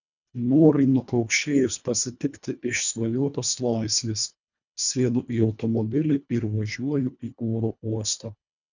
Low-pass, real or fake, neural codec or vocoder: 7.2 kHz; fake; codec, 24 kHz, 1.5 kbps, HILCodec